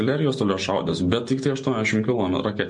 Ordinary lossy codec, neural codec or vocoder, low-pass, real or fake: MP3, 48 kbps; none; 10.8 kHz; real